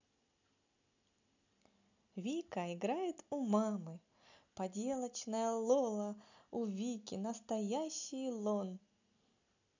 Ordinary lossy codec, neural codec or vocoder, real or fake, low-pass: none; none; real; 7.2 kHz